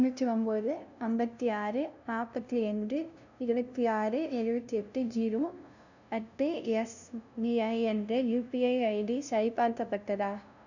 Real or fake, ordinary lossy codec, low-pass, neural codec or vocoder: fake; none; 7.2 kHz; codec, 16 kHz, 0.5 kbps, FunCodec, trained on LibriTTS, 25 frames a second